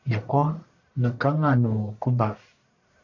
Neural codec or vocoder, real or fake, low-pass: codec, 44.1 kHz, 1.7 kbps, Pupu-Codec; fake; 7.2 kHz